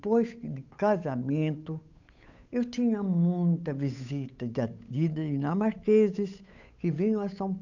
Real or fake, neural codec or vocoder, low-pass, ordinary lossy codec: fake; codec, 16 kHz, 8 kbps, FunCodec, trained on Chinese and English, 25 frames a second; 7.2 kHz; none